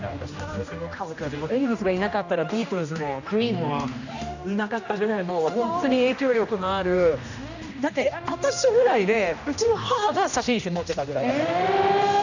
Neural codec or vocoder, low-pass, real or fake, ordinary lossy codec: codec, 16 kHz, 1 kbps, X-Codec, HuBERT features, trained on general audio; 7.2 kHz; fake; none